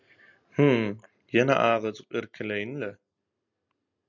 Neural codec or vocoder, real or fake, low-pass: none; real; 7.2 kHz